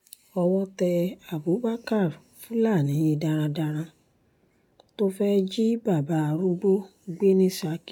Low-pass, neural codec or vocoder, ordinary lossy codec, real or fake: 19.8 kHz; none; none; real